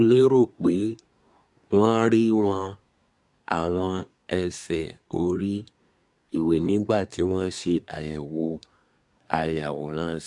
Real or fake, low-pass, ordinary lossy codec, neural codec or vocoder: fake; 10.8 kHz; none; codec, 24 kHz, 1 kbps, SNAC